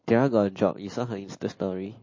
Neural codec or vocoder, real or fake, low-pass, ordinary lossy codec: none; real; 7.2 kHz; MP3, 32 kbps